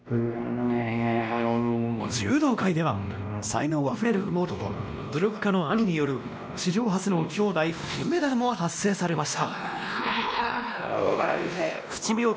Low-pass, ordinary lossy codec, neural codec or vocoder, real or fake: none; none; codec, 16 kHz, 1 kbps, X-Codec, WavLM features, trained on Multilingual LibriSpeech; fake